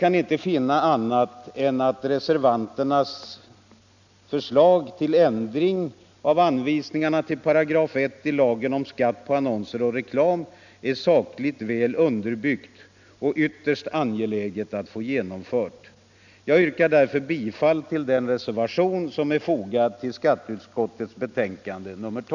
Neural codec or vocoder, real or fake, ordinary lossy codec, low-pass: none; real; none; 7.2 kHz